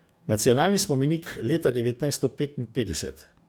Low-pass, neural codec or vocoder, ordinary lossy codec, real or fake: none; codec, 44.1 kHz, 2.6 kbps, DAC; none; fake